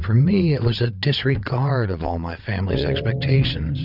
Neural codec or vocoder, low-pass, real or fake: vocoder, 22.05 kHz, 80 mel bands, WaveNeXt; 5.4 kHz; fake